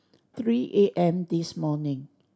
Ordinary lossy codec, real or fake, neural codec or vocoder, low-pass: none; real; none; none